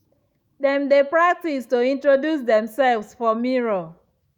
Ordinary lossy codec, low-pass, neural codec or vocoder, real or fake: Opus, 64 kbps; 19.8 kHz; autoencoder, 48 kHz, 128 numbers a frame, DAC-VAE, trained on Japanese speech; fake